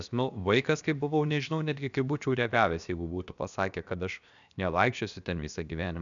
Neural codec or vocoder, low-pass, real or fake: codec, 16 kHz, about 1 kbps, DyCAST, with the encoder's durations; 7.2 kHz; fake